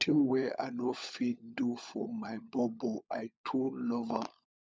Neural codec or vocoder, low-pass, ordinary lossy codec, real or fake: codec, 16 kHz, 16 kbps, FunCodec, trained on LibriTTS, 50 frames a second; none; none; fake